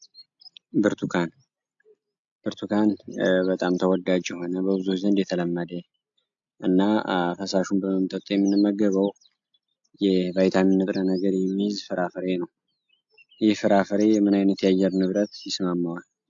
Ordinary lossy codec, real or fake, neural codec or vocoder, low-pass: AAC, 64 kbps; real; none; 7.2 kHz